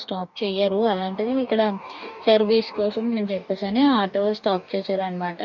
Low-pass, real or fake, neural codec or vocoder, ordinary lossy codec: 7.2 kHz; fake; codec, 44.1 kHz, 2.6 kbps, DAC; none